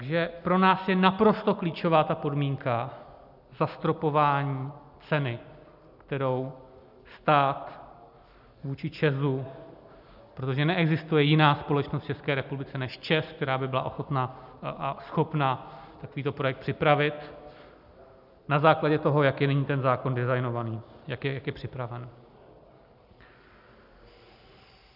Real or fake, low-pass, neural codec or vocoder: real; 5.4 kHz; none